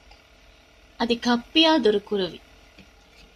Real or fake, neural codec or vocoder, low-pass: real; none; 14.4 kHz